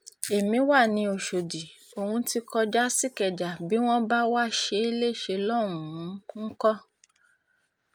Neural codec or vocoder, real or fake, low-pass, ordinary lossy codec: none; real; none; none